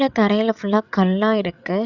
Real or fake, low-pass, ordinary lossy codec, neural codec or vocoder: fake; 7.2 kHz; Opus, 64 kbps; codec, 16 kHz, 8 kbps, FreqCodec, larger model